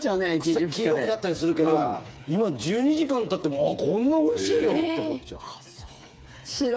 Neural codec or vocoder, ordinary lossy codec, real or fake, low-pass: codec, 16 kHz, 4 kbps, FreqCodec, smaller model; none; fake; none